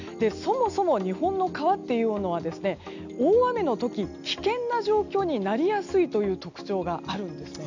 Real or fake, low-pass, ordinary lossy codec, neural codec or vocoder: real; 7.2 kHz; none; none